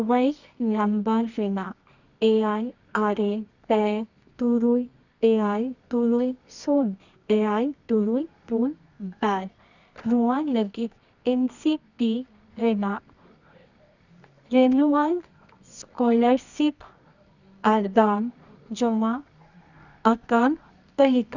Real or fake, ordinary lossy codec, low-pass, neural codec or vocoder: fake; none; 7.2 kHz; codec, 24 kHz, 0.9 kbps, WavTokenizer, medium music audio release